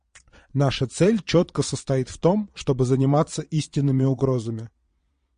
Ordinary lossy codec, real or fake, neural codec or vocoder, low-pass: MP3, 48 kbps; fake; vocoder, 22.05 kHz, 80 mel bands, Vocos; 9.9 kHz